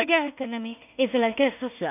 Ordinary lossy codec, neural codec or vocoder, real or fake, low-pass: none; codec, 16 kHz in and 24 kHz out, 0.4 kbps, LongCat-Audio-Codec, two codebook decoder; fake; 3.6 kHz